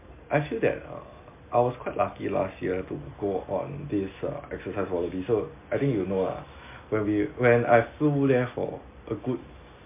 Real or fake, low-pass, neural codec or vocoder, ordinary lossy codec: real; 3.6 kHz; none; MP3, 24 kbps